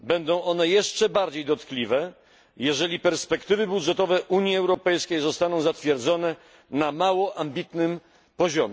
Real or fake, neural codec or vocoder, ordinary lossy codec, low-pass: real; none; none; none